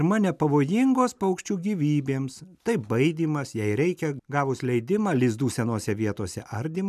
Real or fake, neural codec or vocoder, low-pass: real; none; 14.4 kHz